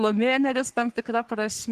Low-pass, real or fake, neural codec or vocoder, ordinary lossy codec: 14.4 kHz; fake; codec, 44.1 kHz, 3.4 kbps, Pupu-Codec; Opus, 16 kbps